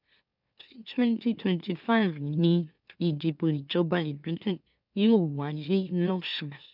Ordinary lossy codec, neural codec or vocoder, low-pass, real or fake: none; autoencoder, 44.1 kHz, a latent of 192 numbers a frame, MeloTTS; 5.4 kHz; fake